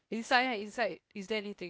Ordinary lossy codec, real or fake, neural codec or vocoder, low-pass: none; fake; codec, 16 kHz, 0.8 kbps, ZipCodec; none